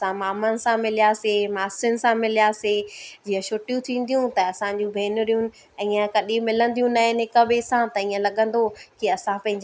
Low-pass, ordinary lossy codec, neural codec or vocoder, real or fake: none; none; none; real